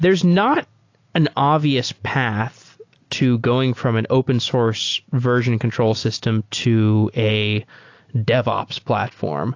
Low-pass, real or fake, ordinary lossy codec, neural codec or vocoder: 7.2 kHz; real; AAC, 48 kbps; none